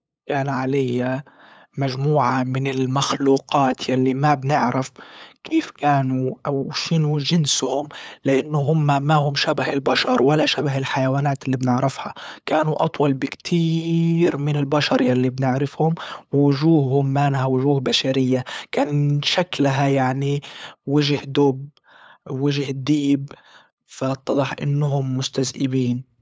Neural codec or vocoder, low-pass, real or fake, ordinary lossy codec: codec, 16 kHz, 8 kbps, FunCodec, trained on LibriTTS, 25 frames a second; none; fake; none